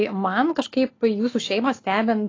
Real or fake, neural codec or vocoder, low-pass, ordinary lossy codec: real; none; 7.2 kHz; AAC, 32 kbps